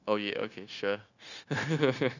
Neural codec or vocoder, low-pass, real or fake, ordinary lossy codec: codec, 16 kHz, 0.9 kbps, LongCat-Audio-Codec; 7.2 kHz; fake; none